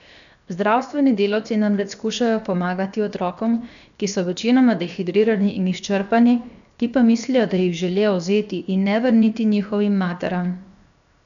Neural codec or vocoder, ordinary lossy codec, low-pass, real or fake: codec, 16 kHz, 0.7 kbps, FocalCodec; none; 7.2 kHz; fake